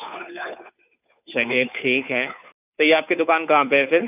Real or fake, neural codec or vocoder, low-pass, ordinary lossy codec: fake; codec, 16 kHz, 2 kbps, FunCodec, trained on Chinese and English, 25 frames a second; 3.6 kHz; none